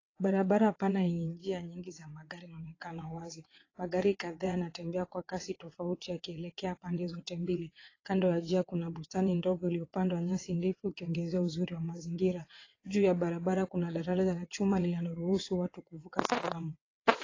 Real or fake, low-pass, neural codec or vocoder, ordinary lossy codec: fake; 7.2 kHz; vocoder, 22.05 kHz, 80 mel bands, WaveNeXt; AAC, 32 kbps